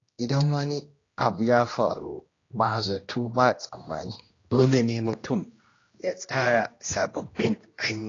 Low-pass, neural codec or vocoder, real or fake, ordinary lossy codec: 7.2 kHz; codec, 16 kHz, 1 kbps, X-Codec, HuBERT features, trained on general audio; fake; AAC, 32 kbps